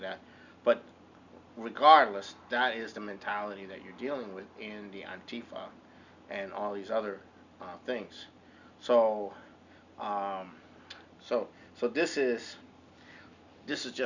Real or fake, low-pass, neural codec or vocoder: real; 7.2 kHz; none